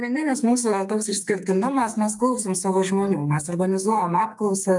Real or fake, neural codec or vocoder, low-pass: fake; codec, 32 kHz, 1.9 kbps, SNAC; 10.8 kHz